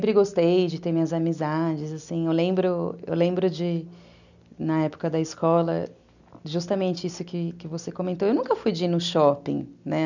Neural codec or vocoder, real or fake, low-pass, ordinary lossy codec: none; real; 7.2 kHz; none